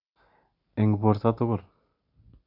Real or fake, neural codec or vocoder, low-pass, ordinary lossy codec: real; none; 5.4 kHz; none